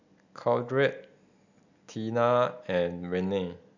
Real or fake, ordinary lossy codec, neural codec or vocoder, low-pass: real; none; none; 7.2 kHz